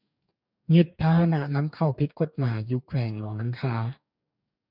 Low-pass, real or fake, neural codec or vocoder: 5.4 kHz; fake; codec, 44.1 kHz, 2.6 kbps, DAC